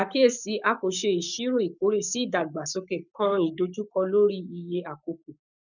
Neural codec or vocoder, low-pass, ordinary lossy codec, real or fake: codec, 44.1 kHz, 7.8 kbps, Pupu-Codec; 7.2 kHz; none; fake